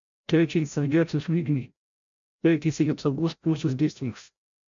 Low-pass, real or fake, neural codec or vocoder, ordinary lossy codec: 7.2 kHz; fake; codec, 16 kHz, 0.5 kbps, FreqCodec, larger model; MP3, 64 kbps